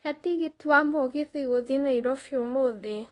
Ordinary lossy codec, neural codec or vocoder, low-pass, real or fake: AAC, 32 kbps; codec, 24 kHz, 0.5 kbps, DualCodec; 10.8 kHz; fake